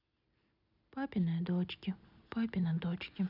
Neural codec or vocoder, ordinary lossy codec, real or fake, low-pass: none; none; real; 5.4 kHz